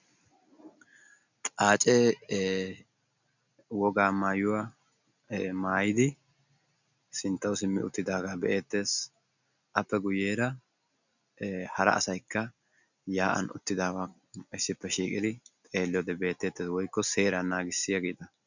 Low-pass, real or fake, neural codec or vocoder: 7.2 kHz; real; none